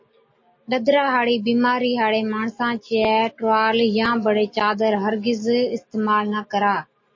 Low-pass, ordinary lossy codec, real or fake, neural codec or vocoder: 7.2 kHz; MP3, 32 kbps; real; none